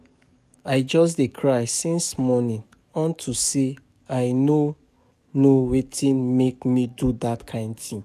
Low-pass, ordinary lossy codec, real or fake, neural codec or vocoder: 14.4 kHz; none; fake; codec, 44.1 kHz, 7.8 kbps, DAC